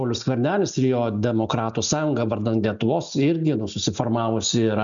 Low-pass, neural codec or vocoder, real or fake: 7.2 kHz; none; real